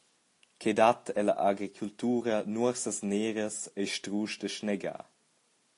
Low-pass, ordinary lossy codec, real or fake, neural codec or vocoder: 10.8 kHz; AAC, 48 kbps; real; none